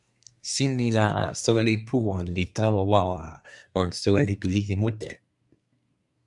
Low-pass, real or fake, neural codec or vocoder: 10.8 kHz; fake; codec, 24 kHz, 1 kbps, SNAC